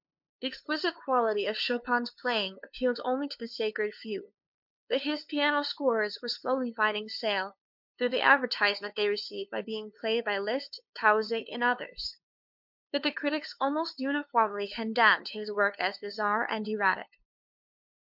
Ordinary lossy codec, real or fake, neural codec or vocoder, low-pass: MP3, 48 kbps; fake; codec, 16 kHz, 2 kbps, FunCodec, trained on LibriTTS, 25 frames a second; 5.4 kHz